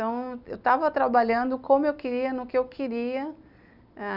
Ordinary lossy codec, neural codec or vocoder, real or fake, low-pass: none; none; real; 5.4 kHz